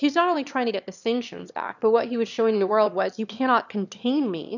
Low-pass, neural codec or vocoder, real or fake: 7.2 kHz; autoencoder, 22.05 kHz, a latent of 192 numbers a frame, VITS, trained on one speaker; fake